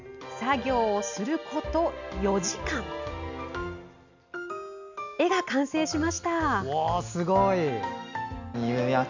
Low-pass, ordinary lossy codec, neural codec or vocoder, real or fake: 7.2 kHz; none; none; real